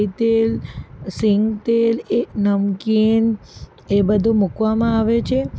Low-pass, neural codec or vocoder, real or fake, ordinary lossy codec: none; none; real; none